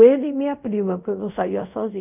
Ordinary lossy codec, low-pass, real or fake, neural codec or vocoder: none; 3.6 kHz; fake; codec, 24 kHz, 0.5 kbps, DualCodec